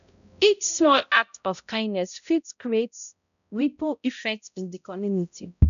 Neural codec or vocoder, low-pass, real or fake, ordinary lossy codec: codec, 16 kHz, 0.5 kbps, X-Codec, HuBERT features, trained on balanced general audio; 7.2 kHz; fake; none